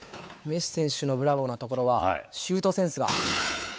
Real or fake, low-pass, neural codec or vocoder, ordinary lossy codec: fake; none; codec, 16 kHz, 4 kbps, X-Codec, WavLM features, trained on Multilingual LibriSpeech; none